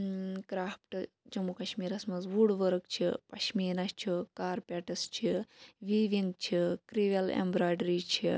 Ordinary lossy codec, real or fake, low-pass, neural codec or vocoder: none; real; none; none